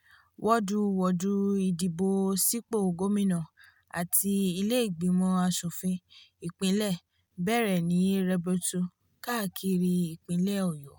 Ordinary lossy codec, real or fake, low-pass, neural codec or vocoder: none; real; none; none